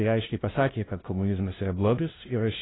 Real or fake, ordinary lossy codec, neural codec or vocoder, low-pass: fake; AAC, 16 kbps; codec, 16 kHz, 0.5 kbps, FunCodec, trained on LibriTTS, 25 frames a second; 7.2 kHz